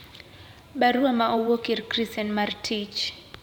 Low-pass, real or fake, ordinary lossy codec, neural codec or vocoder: 19.8 kHz; fake; none; vocoder, 44.1 kHz, 128 mel bands every 512 samples, BigVGAN v2